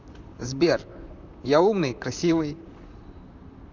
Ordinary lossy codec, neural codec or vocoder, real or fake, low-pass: none; vocoder, 44.1 kHz, 128 mel bands, Pupu-Vocoder; fake; 7.2 kHz